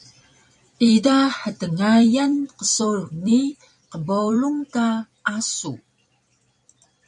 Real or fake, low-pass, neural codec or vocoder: fake; 10.8 kHz; vocoder, 44.1 kHz, 128 mel bands every 256 samples, BigVGAN v2